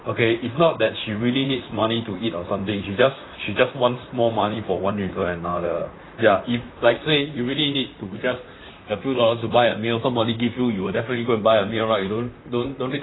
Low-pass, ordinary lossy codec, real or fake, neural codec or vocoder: 7.2 kHz; AAC, 16 kbps; fake; vocoder, 44.1 kHz, 128 mel bands, Pupu-Vocoder